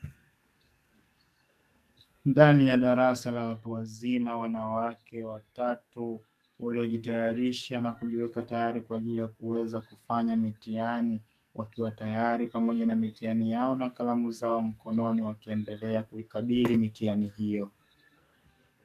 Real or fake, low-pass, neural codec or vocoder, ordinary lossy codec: fake; 14.4 kHz; codec, 44.1 kHz, 2.6 kbps, SNAC; MP3, 96 kbps